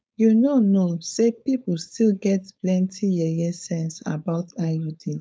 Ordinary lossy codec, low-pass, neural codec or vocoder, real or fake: none; none; codec, 16 kHz, 4.8 kbps, FACodec; fake